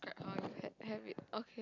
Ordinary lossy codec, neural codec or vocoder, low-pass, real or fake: none; none; 7.2 kHz; real